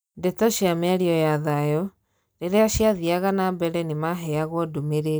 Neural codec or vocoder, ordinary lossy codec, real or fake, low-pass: none; none; real; none